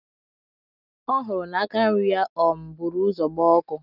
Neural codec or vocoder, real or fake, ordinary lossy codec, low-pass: none; real; none; 5.4 kHz